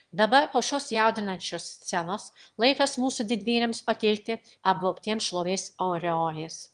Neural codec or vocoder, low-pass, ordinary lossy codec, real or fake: autoencoder, 22.05 kHz, a latent of 192 numbers a frame, VITS, trained on one speaker; 9.9 kHz; Opus, 24 kbps; fake